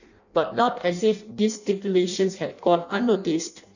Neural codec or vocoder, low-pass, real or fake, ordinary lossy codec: codec, 16 kHz in and 24 kHz out, 0.6 kbps, FireRedTTS-2 codec; 7.2 kHz; fake; none